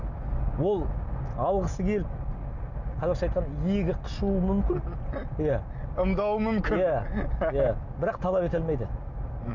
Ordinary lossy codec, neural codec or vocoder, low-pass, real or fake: none; none; 7.2 kHz; real